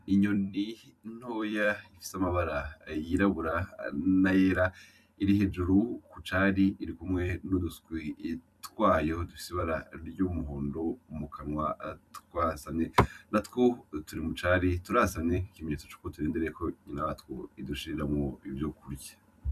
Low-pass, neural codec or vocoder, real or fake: 14.4 kHz; vocoder, 44.1 kHz, 128 mel bands every 256 samples, BigVGAN v2; fake